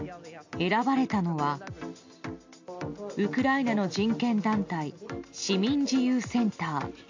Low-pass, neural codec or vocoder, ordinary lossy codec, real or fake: 7.2 kHz; none; AAC, 48 kbps; real